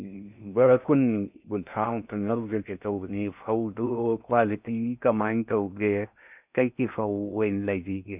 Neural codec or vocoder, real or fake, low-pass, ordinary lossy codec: codec, 16 kHz in and 24 kHz out, 0.6 kbps, FocalCodec, streaming, 4096 codes; fake; 3.6 kHz; none